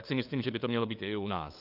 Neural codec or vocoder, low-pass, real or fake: codec, 16 kHz, 2 kbps, FunCodec, trained on LibriTTS, 25 frames a second; 5.4 kHz; fake